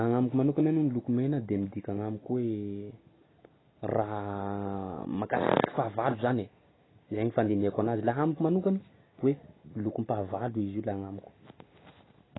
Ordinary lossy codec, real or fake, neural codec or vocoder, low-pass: AAC, 16 kbps; real; none; 7.2 kHz